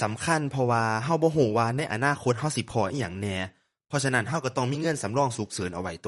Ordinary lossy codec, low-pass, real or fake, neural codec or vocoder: MP3, 48 kbps; 19.8 kHz; fake; vocoder, 44.1 kHz, 128 mel bands, Pupu-Vocoder